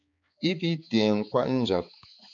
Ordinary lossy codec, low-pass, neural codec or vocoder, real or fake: MP3, 64 kbps; 7.2 kHz; codec, 16 kHz, 4 kbps, X-Codec, HuBERT features, trained on balanced general audio; fake